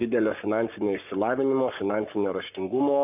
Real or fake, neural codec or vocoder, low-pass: fake; codec, 44.1 kHz, 7.8 kbps, Pupu-Codec; 3.6 kHz